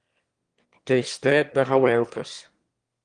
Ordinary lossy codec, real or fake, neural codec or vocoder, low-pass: Opus, 24 kbps; fake; autoencoder, 22.05 kHz, a latent of 192 numbers a frame, VITS, trained on one speaker; 9.9 kHz